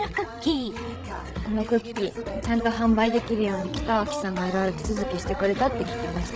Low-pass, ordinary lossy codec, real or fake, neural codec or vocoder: none; none; fake; codec, 16 kHz, 16 kbps, FreqCodec, larger model